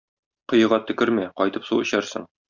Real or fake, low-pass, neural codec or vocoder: real; 7.2 kHz; none